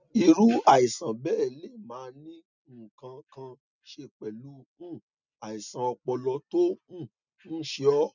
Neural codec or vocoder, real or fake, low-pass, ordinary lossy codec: none; real; 7.2 kHz; none